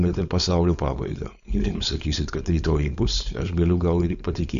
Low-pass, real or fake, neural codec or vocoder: 7.2 kHz; fake; codec, 16 kHz, 4.8 kbps, FACodec